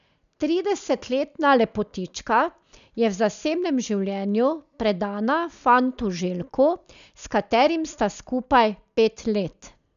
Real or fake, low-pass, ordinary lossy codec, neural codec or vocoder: real; 7.2 kHz; none; none